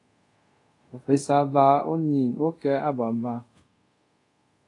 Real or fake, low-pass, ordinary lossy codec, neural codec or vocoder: fake; 10.8 kHz; AAC, 48 kbps; codec, 24 kHz, 0.5 kbps, DualCodec